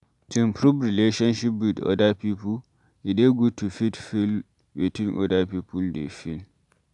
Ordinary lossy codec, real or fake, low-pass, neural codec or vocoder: none; real; 10.8 kHz; none